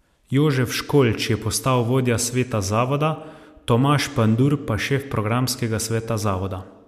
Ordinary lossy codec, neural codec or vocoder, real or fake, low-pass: MP3, 96 kbps; none; real; 14.4 kHz